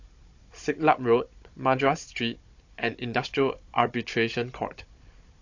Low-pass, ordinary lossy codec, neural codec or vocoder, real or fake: 7.2 kHz; AAC, 48 kbps; codec, 16 kHz, 16 kbps, FunCodec, trained on Chinese and English, 50 frames a second; fake